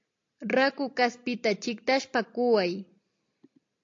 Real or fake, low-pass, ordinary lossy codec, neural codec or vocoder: real; 7.2 kHz; AAC, 48 kbps; none